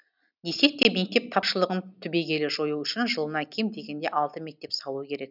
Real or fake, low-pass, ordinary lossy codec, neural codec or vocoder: real; 5.4 kHz; none; none